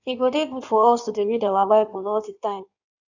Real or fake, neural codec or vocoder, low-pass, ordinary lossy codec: fake; codec, 16 kHz in and 24 kHz out, 1.1 kbps, FireRedTTS-2 codec; 7.2 kHz; none